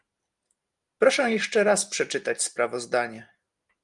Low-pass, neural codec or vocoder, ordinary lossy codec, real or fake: 10.8 kHz; none; Opus, 32 kbps; real